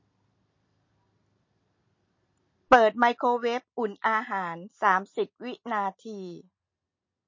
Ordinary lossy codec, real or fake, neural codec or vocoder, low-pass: MP3, 32 kbps; real; none; 7.2 kHz